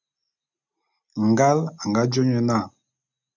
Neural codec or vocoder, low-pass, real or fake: none; 7.2 kHz; real